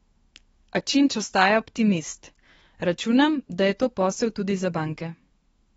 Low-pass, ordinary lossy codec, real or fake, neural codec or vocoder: 19.8 kHz; AAC, 24 kbps; fake; autoencoder, 48 kHz, 128 numbers a frame, DAC-VAE, trained on Japanese speech